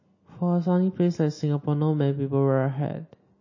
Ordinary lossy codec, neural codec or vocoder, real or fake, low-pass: MP3, 32 kbps; none; real; 7.2 kHz